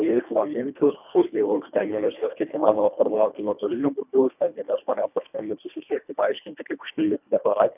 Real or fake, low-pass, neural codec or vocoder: fake; 3.6 kHz; codec, 24 kHz, 1.5 kbps, HILCodec